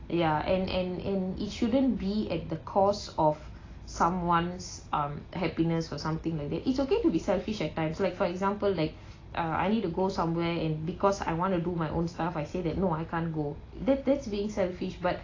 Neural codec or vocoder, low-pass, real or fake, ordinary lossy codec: none; 7.2 kHz; real; AAC, 32 kbps